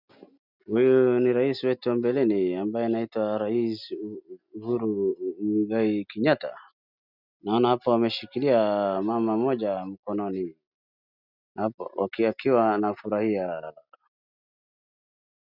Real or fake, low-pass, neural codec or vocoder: real; 5.4 kHz; none